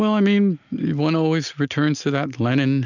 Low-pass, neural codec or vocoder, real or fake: 7.2 kHz; none; real